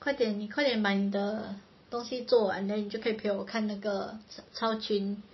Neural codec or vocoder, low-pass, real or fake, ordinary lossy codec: none; 7.2 kHz; real; MP3, 24 kbps